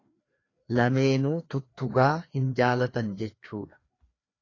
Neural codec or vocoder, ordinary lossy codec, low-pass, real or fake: codec, 16 kHz, 2 kbps, FreqCodec, larger model; AAC, 32 kbps; 7.2 kHz; fake